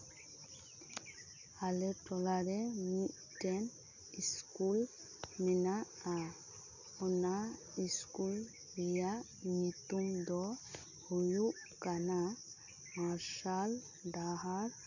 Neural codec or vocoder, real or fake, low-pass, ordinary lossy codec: none; real; 7.2 kHz; none